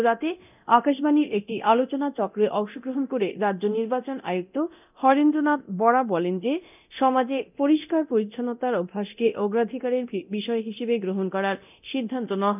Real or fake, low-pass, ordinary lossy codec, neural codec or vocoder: fake; 3.6 kHz; none; codec, 24 kHz, 0.9 kbps, DualCodec